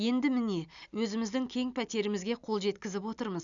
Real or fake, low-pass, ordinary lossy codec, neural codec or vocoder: real; 7.2 kHz; none; none